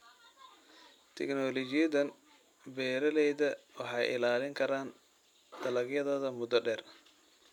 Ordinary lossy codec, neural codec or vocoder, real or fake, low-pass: none; none; real; 19.8 kHz